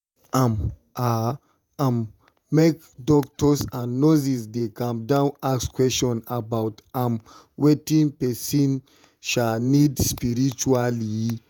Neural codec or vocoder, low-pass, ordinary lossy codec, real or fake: vocoder, 48 kHz, 128 mel bands, Vocos; 19.8 kHz; none; fake